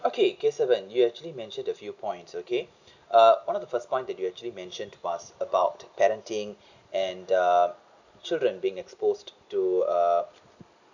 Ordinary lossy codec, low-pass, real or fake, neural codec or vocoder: none; 7.2 kHz; real; none